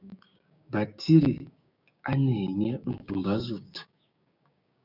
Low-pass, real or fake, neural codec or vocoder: 5.4 kHz; fake; codec, 16 kHz, 6 kbps, DAC